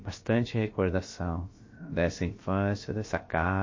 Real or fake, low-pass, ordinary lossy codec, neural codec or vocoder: fake; 7.2 kHz; MP3, 32 kbps; codec, 16 kHz, about 1 kbps, DyCAST, with the encoder's durations